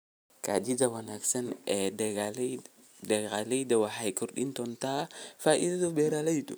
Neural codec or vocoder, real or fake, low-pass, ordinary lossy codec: none; real; none; none